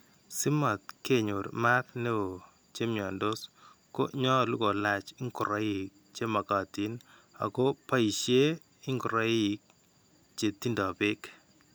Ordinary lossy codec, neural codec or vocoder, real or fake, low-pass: none; none; real; none